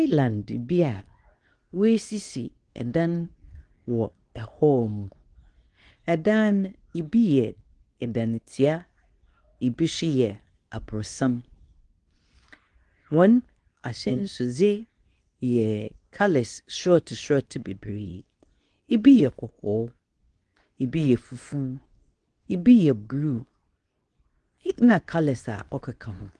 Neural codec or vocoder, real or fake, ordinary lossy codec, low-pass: codec, 24 kHz, 0.9 kbps, WavTokenizer, medium speech release version 2; fake; Opus, 24 kbps; 10.8 kHz